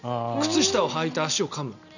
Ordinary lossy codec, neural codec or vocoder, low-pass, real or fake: none; none; 7.2 kHz; real